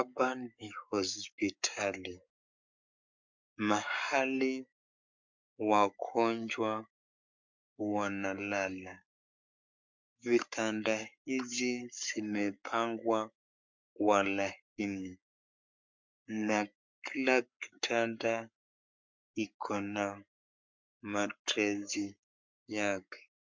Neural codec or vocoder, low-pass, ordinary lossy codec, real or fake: codec, 44.1 kHz, 7.8 kbps, Pupu-Codec; 7.2 kHz; MP3, 64 kbps; fake